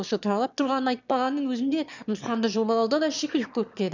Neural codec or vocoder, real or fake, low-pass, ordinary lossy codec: autoencoder, 22.05 kHz, a latent of 192 numbers a frame, VITS, trained on one speaker; fake; 7.2 kHz; none